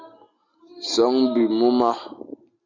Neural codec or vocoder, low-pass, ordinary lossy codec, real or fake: none; 7.2 kHz; MP3, 64 kbps; real